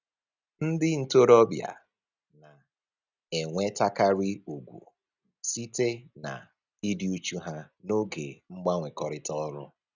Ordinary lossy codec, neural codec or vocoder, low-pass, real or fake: none; none; 7.2 kHz; real